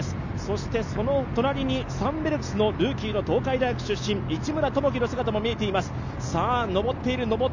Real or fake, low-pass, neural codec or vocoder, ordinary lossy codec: real; 7.2 kHz; none; none